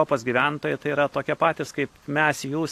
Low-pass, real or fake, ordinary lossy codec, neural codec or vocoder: 14.4 kHz; real; AAC, 64 kbps; none